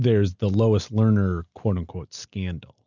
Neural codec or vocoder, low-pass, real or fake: none; 7.2 kHz; real